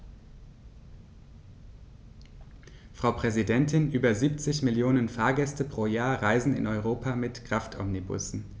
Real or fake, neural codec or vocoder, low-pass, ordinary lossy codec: real; none; none; none